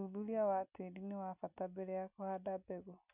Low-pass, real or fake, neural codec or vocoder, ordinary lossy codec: 3.6 kHz; real; none; none